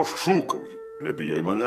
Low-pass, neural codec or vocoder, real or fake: 14.4 kHz; codec, 44.1 kHz, 2.6 kbps, SNAC; fake